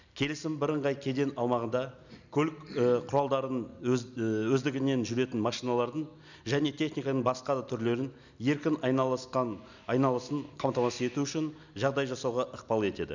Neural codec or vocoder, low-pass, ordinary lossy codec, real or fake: none; 7.2 kHz; none; real